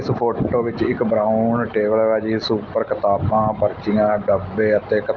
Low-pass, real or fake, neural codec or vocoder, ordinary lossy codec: 7.2 kHz; real; none; Opus, 32 kbps